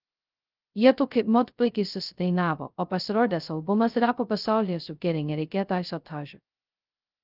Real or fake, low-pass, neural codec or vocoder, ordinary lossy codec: fake; 5.4 kHz; codec, 16 kHz, 0.2 kbps, FocalCodec; Opus, 24 kbps